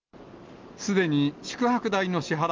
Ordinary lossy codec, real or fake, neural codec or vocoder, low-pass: Opus, 32 kbps; real; none; 7.2 kHz